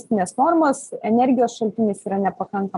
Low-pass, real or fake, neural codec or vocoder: 10.8 kHz; real; none